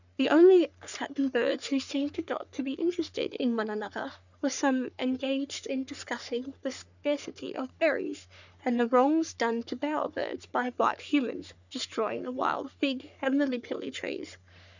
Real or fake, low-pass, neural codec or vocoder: fake; 7.2 kHz; codec, 44.1 kHz, 3.4 kbps, Pupu-Codec